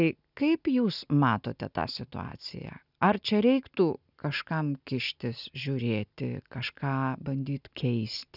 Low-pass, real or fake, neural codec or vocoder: 5.4 kHz; real; none